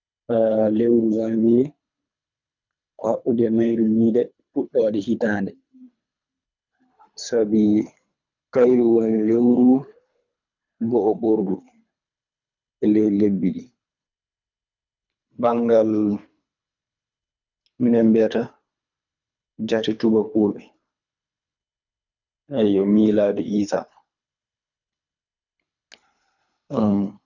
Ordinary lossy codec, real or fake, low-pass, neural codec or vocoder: none; fake; 7.2 kHz; codec, 24 kHz, 3 kbps, HILCodec